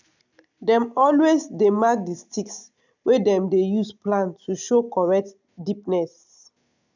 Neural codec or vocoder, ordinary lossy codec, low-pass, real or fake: none; none; 7.2 kHz; real